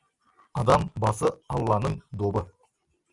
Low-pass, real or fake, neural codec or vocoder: 10.8 kHz; real; none